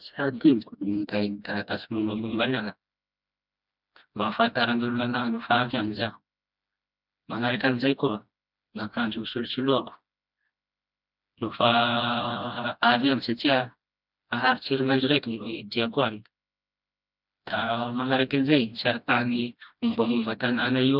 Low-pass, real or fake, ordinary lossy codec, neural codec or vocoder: 5.4 kHz; fake; none; codec, 16 kHz, 1 kbps, FreqCodec, smaller model